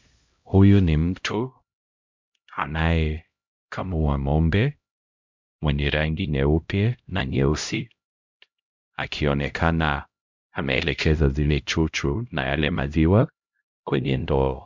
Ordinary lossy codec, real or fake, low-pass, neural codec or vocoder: MP3, 64 kbps; fake; 7.2 kHz; codec, 16 kHz, 0.5 kbps, X-Codec, HuBERT features, trained on LibriSpeech